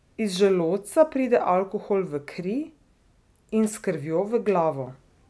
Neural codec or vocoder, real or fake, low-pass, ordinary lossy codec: none; real; none; none